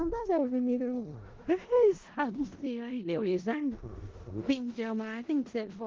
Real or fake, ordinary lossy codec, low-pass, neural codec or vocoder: fake; Opus, 16 kbps; 7.2 kHz; codec, 16 kHz in and 24 kHz out, 0.4 kbps, LongCat-Audio-Codec, four codebook decoder